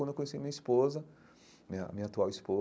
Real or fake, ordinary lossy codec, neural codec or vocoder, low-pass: real; none; none; none